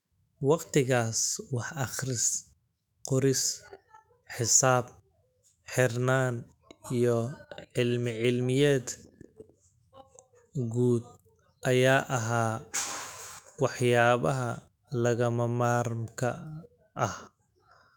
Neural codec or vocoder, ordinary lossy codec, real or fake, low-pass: autoencoder, 48 kHz, 128 numbers a frame, DAC-VAE, trained on Japanese speech; none; fake; 19.8 kHz